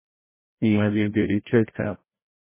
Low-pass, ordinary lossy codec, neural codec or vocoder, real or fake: 3.6 kHz; MP3, 16 kbps; codec, 16 kHz, 0.5 kbps, FreqCodec, larger model; fake